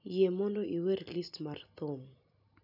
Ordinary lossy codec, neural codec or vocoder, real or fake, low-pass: none; none; real; 5.4 kHz